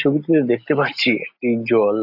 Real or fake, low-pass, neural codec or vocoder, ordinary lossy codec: real; 5.4 kHz; none; AAC, 48 kbps